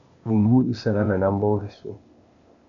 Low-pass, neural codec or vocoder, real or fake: 7.2 kHz; codec, 16 kHz, 0.8 kbps, ZipCodec; fake